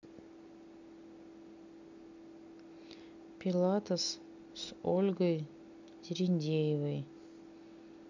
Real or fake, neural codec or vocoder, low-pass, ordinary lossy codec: real; none; 7.2 kHz; none